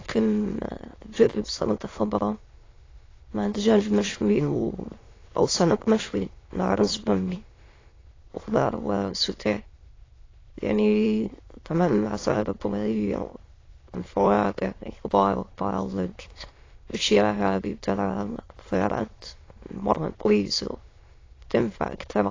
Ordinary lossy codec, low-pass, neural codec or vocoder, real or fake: AAC, 32 kbps; 7.2 kHz; autoencoder, 22.05 kHz, a latent of 192 numbers a frame, VITS, trained on many speakers; fake